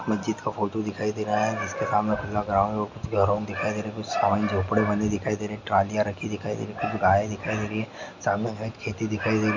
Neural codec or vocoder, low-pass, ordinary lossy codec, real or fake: none; 7.2 kHz; MP3, 64 kbps; real